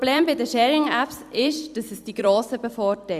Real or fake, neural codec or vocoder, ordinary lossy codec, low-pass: fake; vocoder, 44.1 kHz, 128 mel bands every 256 samples, BigVGAN v2; Opus, 64 kbps; 14.4 kHz